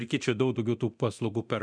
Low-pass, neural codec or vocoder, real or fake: 9.9 kHz; codec, 24 kHz, 0.9 kbps, DualCodec; fake